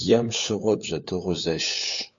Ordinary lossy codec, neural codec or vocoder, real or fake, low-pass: MP3, 48 kbps; codec, 16 kHz, 4 kbps, FunCodec, trained on LibriTTS, 50 frames a second; fake; 7.2 kHz